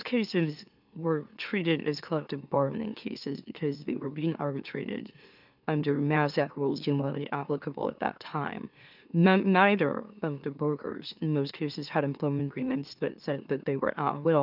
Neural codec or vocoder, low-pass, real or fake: autoencoder, 44.1 kHz, a latent of 192 numbers a frame, MeloTTS; 5.4 kHz; fake